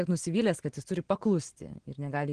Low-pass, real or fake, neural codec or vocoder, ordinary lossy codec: 9.9 kHz; real; none; Opus, 16 kbps